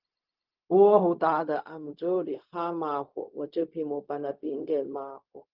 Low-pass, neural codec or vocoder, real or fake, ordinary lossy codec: 5.4 kHz; codec, 16 kHz, 0.4 kbps, LongCat-Audio-Codec; fake; Opus, 32 kbps